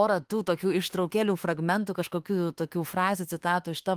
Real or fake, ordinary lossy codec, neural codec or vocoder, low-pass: fake; Opus, 24 kbps; autoencoder, 48 kHz, 32 numbers a frame, DAC-VAE, trained on Japanese speech; 14.4 kHz